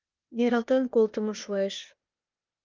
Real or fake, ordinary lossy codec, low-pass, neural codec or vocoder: fake; Opus, 24 kbps; 7.2 kHz; codec, 16 kHz, 0.8 kbps, ZipCodec